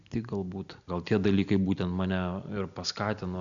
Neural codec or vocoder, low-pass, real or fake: none; 7.2 kHz; real